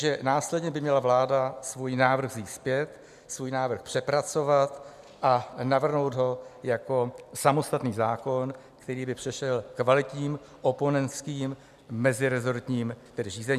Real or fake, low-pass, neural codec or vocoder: real; 14.4 kHz; none